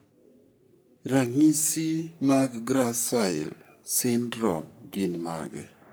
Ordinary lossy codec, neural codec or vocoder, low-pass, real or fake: none; codec, 44.1 kHz, 3.4 kbps, Pupu-Codec; none; fake